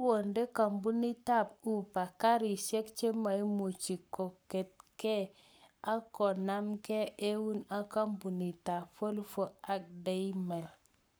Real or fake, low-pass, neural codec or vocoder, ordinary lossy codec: fake; none; codec, 44.1 kHz, 7.8 kbps, Pupu-Codec; none